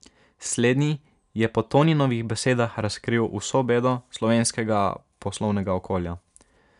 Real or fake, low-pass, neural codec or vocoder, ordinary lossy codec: real; 10.8 kHz; none; none